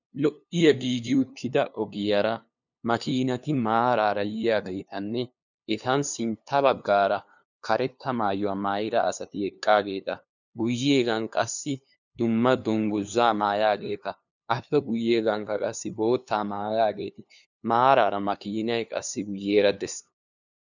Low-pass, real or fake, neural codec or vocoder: 7.2 kHz; fake; codec, 16 kHz, 2 kbps, FunCodec, trained on LibriTTS, 25 frames a second